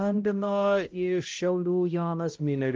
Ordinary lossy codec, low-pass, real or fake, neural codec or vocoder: Opus, 32 kbps; 7.2 kHz; fake; codec, 16 kHz, 0.5 kbps, X-Codec, HuBERT features, trained on balanced general audio